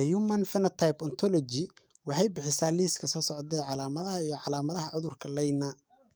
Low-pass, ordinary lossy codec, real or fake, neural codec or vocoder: none; none; fake; codec, 44.1 kHz, 7.8 kbps, DAC